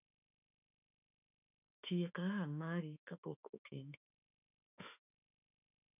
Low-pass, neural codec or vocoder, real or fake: 3.6 kHz; autoencoder, 48 kHz, 32 numbers a frame, DAC-VAE, trained on Japanese speech; fake